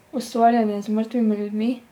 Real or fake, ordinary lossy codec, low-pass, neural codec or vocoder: fake; none; 19.8 kHz; codec, 44.1 kHz, 7.8 kbps, DAC